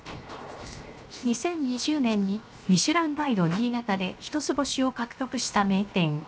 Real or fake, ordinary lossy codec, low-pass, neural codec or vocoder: fake; none; none; codec, 16 kHz, 0.7 kbps, FocalCodec